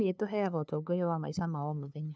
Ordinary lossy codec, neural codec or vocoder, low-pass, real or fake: none; codec, 16 kHz, 2 kbps, FunCodec, trained on LibriTTS, 25 frames a second; none; fake